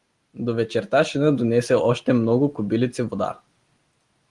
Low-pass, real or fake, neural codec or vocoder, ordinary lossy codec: 10.8 kHz; real; none; Opus, 24 kbps